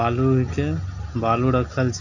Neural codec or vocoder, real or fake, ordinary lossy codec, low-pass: none; real; none; 7.2 kHz